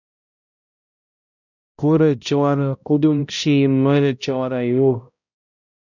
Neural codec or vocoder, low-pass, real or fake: codec, 16 kHz, 0.5 kbps, X-Codec, HuBERT features, trained on balanced general audio; 7.2 kHz; fake